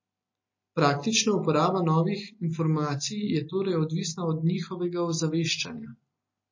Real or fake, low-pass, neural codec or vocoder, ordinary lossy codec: real; 7.2 kHz; none; MP3, 32 kbps